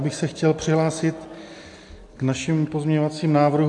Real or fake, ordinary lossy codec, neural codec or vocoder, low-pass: real; AAC, 64 kbps; none; 10.8 kHz